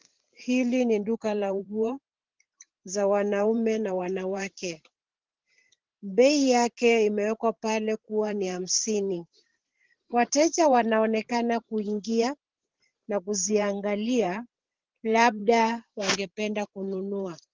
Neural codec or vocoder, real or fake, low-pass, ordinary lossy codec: vocoder, 44.1 kHz, 128 mel bands every 512 samples, BigVGAN v2; fake; 7.2 kHz; Opus, 16 kbps